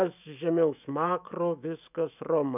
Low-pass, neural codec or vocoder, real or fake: 3.6 kHz; autoencoder, 48 kHz, 128 numbers a frame, DAC-VAE, trained on Japanese speech; fake